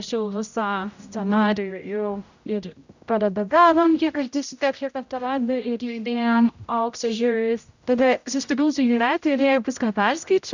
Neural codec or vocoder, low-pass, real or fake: codec, 16 kHz, 0.5 kbps, X-Codec, HuBERT features, trained on general audio; 7.2 kHz; fake